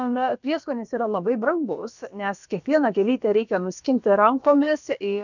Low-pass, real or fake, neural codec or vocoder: 7.2 kHz; fake; codec, 16 kHz, about 1 kbps, DyCAST, with the encoder's durations